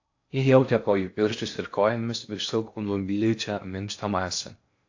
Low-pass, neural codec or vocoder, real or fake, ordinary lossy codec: 7.2 kHz; codec, 16 kHz in and 24 kHz out, 0.6 kbps, FocalCodec, streaming, 4096 codes; fake; AAC, 48 kbps